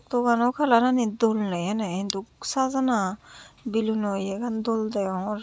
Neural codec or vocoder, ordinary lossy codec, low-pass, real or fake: none; none; none; real